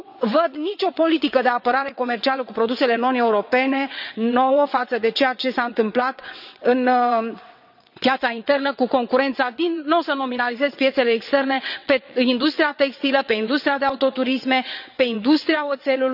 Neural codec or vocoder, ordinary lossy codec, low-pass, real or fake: vocoder, 22.05 kHz, 80 mel bands, WaveNeXt; none; 5.4 kHz; fake